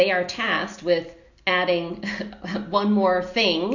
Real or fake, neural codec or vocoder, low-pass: real; none; 7.2 kHz